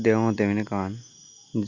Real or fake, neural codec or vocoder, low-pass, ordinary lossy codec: real; none; 7.2 kHz; Opus, 64 kbps